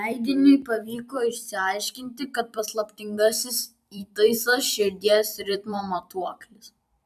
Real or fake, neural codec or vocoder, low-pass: fake; vocoder, 44.1 kHz, 128 mel bands every 256 samples, BigVGAN v2; 14.4 kHz